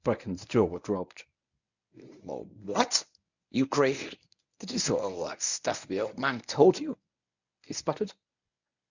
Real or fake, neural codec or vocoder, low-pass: fake; codec, 24 kHz, 0.9 kbps, WavTokenizer, medium speech release version 1; 7.2 kHz